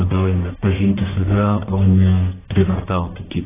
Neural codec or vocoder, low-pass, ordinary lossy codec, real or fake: codec, 44.1 kHz, 1.7 kbps, Pupu-Codec; 3.6 kHz; AAC, 16 kbps; fake